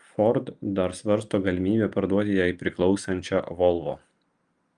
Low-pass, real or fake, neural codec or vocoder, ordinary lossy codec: 9.9 kHz; real; none; Opus, 32 kbps